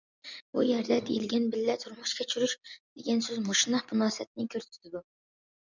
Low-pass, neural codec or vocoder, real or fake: 7.2 kHz; none; real